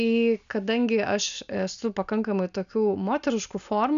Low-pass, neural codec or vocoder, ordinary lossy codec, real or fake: 7.2 kHz; none; AAC, 96 kbps; real